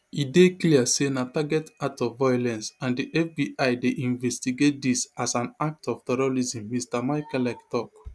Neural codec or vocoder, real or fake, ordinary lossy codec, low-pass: none; real; none; none